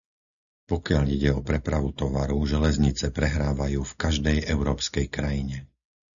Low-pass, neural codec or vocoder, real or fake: 7.2 kHz; none; real